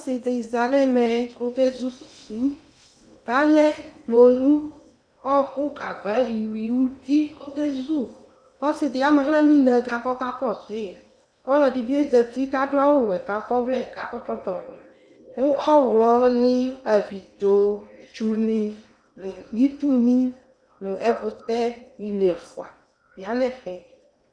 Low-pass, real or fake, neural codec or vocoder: 9.9 kHz; fake; codec, 16 kHz in and 24 kHz out, 0.8 kbps, FocalCodec, streaming, 65536 codes